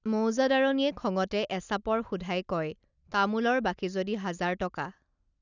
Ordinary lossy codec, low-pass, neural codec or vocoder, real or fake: none; 7.2 kHz; none; real